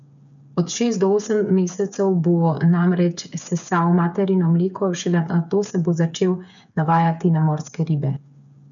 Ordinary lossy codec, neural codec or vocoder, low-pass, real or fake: none; codec, 16 kHz, 8 kbps, FreqCodec, smaller model; 7.2 kHz; fake